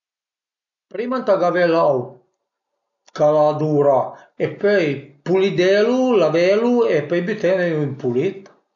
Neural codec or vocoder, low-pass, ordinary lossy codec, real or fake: none; 7.2 kHz; none; real